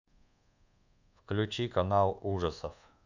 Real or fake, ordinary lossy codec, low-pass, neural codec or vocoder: fake; none; 7.2 kHz; codec, 24 kHz, 1.2 kbps, DualCodec